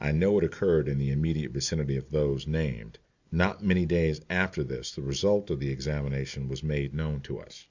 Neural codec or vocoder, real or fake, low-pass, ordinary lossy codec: none; real; 7.2 kHz; Opus, 64 kbps